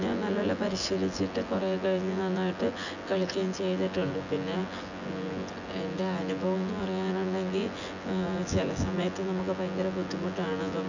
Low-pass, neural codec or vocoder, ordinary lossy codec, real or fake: 7.2 kHz; vocoder, 24 kHz, 100 mel bands, Vocos; none; fake